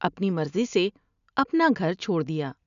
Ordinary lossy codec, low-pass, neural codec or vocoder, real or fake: none; 7.2 kHz; none; real